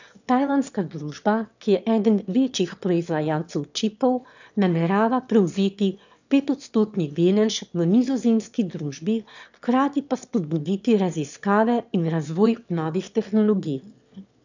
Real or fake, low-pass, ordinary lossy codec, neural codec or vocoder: fake; 7.2 kHz; none; autoencoder, 22.05 kHz, a latent of 192 numbers a frame, VITS, trained on one speaker